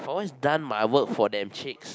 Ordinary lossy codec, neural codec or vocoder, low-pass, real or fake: none; none; none; real